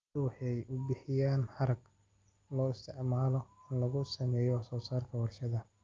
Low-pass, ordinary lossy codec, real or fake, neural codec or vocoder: 7.2 kHz; Opus, 24 kbps; real; none